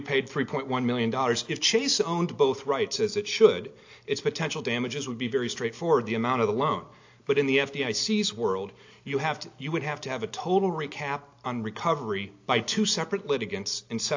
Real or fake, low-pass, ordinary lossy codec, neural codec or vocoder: real; 7.2 kHz; AAC, 48 kbps; none